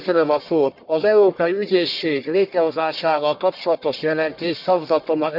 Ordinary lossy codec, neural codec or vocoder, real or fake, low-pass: none; codec, 44.1 kHz, 1.7 kbps, Pupu-Codec; fake; 5.4 kHz